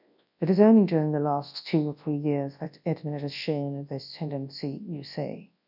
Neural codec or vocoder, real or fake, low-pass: codec, 24 kHz, 0.9 kbps, WavTokenizer, large speech release; fake; 5.4 kHz